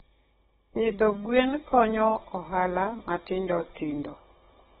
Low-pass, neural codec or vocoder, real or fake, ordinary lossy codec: 7.2 kHz; codec, 16 kHz, 16 kbps, FunCodec, trained on Chinese and English, 50 frames a second; fake; AAC, 16 kbps